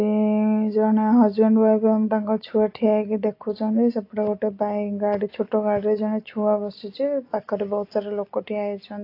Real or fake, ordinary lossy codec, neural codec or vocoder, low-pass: real; AAC, 32 kbps; none; 5.4 kHz